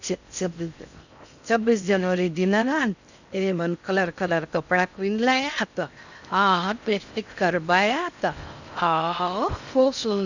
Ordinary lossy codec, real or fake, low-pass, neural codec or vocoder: none; fake; 7.2 kHz; codec, 16 kHz in and 24 kHz out, 0.6 kbps, FocalCodec, streaming, 4096 codes